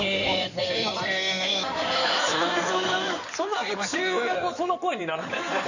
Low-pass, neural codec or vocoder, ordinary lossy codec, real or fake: 7.2 kHz; codec, 16 kHz in and 24 kHz out, 2.2 kbps, FireRedTTS-2 codec; none; fake